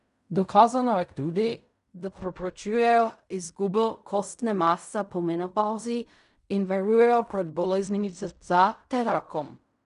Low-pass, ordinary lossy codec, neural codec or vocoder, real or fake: 10.8 kHz; none; codec, 16 kHz in and 24 kHz out, 0.4 kbps, LongCat-Audio-Codec, fine tuned four codebook decoder; fake